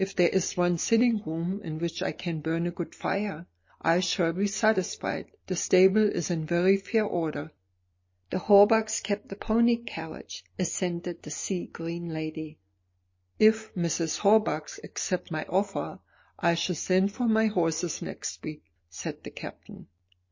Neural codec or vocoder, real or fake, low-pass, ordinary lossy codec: none; real; 7.2 kHz; MP3, 32 kbps